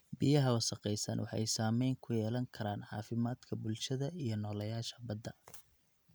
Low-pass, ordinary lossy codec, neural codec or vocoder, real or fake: none; none; none; real